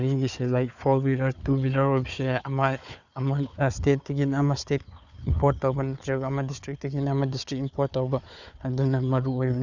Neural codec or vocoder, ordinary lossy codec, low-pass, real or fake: codec, 16 kHz, 4 kbps, FunCodec, trained on Chinese and English, 50 frames a second; none; 7.2 kHz; fake